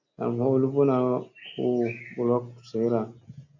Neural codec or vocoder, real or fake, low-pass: none; real; 7.2 kHz